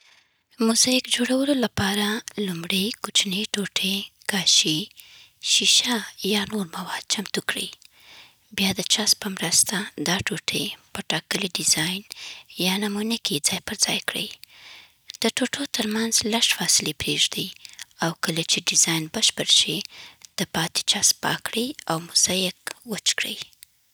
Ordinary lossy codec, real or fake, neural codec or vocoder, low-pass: none; real; none; none